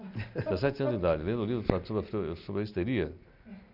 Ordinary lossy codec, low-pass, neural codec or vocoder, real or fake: none; 5.4 kHz; none; real